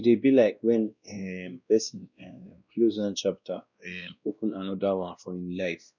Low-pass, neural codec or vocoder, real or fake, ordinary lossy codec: 7.2 kHz; codec, 16 kHz, 1 kbps, X-Codec, WavLM features, trained on Multilingual LibriSpeech; fake; none